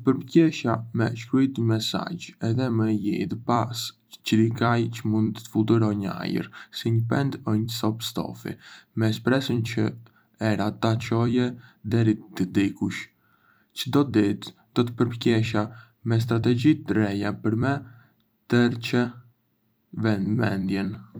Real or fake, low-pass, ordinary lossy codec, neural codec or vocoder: fake; none; none; vocoder, 44.1 kHz, 128 mel bands every 256 samples, BigVGAN v2